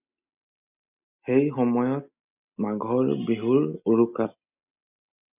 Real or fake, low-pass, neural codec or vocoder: real; 3.6 kHz; none